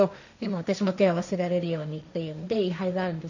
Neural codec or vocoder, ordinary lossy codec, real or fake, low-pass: codec, 16 kHz, 1.1 kbps, Voila-Tokenizer; none; fake; 7.2 kHz